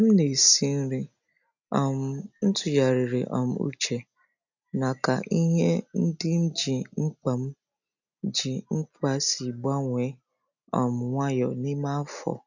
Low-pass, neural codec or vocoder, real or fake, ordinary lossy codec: 7.2 kHz; none; real; none